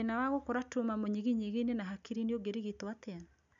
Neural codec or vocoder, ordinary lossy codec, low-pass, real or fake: none; MP3, 96 kbps; 7.2 kHz; real